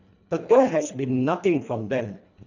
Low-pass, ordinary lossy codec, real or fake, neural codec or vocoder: 7.2 kHz; none; fake; codec, 24 kHz, 1.5 kbps, HILCodec